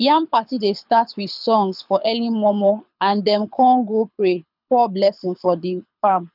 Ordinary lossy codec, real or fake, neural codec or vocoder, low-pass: none; fake; codec, 24 kHz, 6 kbps, HILCodec; 5.4 kHz